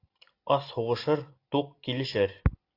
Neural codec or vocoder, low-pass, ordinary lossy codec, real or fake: none; 5.4 kHz; AAC, 32 kbps; real